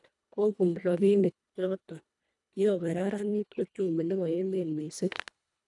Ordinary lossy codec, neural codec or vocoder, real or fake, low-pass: none; codec, 24 kHz, 1.5 kbps, HILCodec; fake; 10.8 kHz